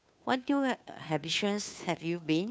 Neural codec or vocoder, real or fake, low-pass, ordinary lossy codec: codec, 16 kHz, 2 kbps, FunCodec, trained on Chinese and English, 25 frames a second; fake; none; none